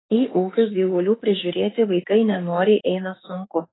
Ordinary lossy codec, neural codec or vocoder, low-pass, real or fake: AAC, 16 kbps; autoencoder, 48 kHz, 32 numbers a frame, DAC-VAE, trained on Japanese speech; 7.2 kHz; fake